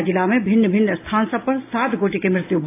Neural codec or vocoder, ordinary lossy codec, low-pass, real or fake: none; AAC, 24 kbps; 3.6 kHz; real